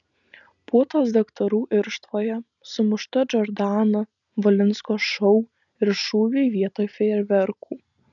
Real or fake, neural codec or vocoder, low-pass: real; none; 7.2 kHz